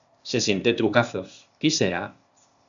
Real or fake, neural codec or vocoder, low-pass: fake; codec, 16 kHz, 0.8 kbps, ZipCodec; 7.2 kHz